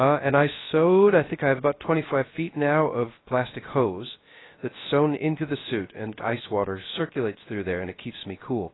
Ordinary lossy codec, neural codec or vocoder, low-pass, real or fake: AAC, 16 kbps; codec, 16 kHz, 0.3 kbps, FocalCodec; 7.2 kHz; fake